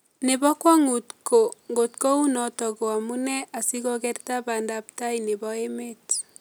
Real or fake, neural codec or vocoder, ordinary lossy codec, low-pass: real; none; none; none